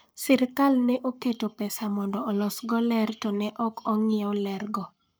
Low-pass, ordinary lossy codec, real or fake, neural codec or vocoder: none; none; fake; codec, 44.1 kHz, 7.8 kbps, Pupu-Codec